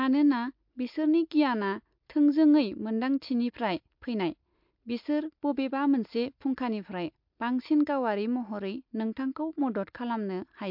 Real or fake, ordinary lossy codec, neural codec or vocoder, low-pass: real; MP3, 48 kbps; none; 5.4 kHz